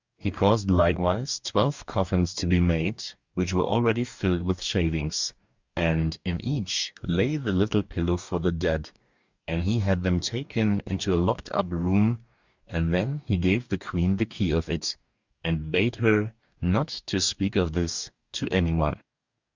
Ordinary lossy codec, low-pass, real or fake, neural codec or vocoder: Opus, 64 kbps; 7.2 kHz; fake; codec, 44.1 kHz, 2.6 kbps, DAC